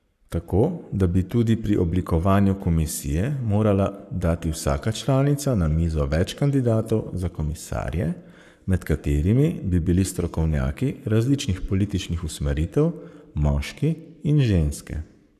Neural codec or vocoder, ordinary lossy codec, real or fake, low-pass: codec, 44.1 kHz, 7.8 kbps, Pupu-Codec; none; fake; 14.4 kHz